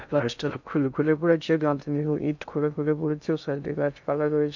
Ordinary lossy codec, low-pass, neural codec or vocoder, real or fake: none; 7.2 kHz; codec, 16 kHz in and 24 kHz out, 0.6 kbps, FocalCodec, streaming, 2048 codes; fake